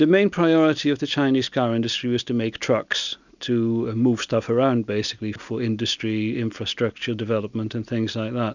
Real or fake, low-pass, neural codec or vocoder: real; 7.2 kHz; none